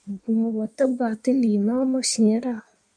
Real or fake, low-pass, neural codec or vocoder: fake; 9.9 kHz; codec, 16 kHz in and 24 kHz out, 1.1 kbps, FireRedTTS-2 codec